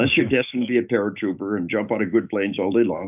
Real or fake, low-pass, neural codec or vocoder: fake; 3.6 kHz; autoencoder, 48 kHz, 128 numbers a frame, DAC-VAE, trained on Japanese speech